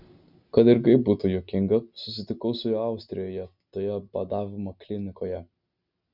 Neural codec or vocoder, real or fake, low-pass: none; real; 5.4 kHz